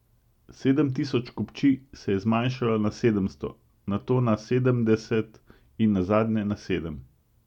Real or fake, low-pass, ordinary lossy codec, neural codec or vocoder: real; 19.8 kHz; none; none